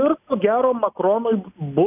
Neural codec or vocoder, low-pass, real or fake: none; 3.6 kHz; real